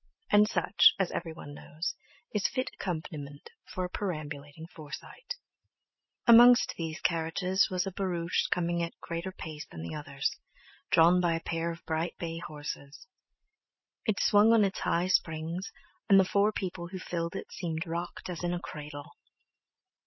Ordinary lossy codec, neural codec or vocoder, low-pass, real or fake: MP3, 24 kbps; none; 7.2 kHz; real